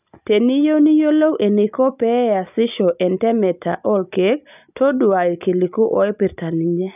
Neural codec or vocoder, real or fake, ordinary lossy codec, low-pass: none; real; none; 3.6 kHz